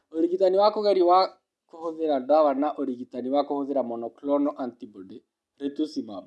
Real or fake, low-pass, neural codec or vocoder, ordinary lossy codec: real; none; none; none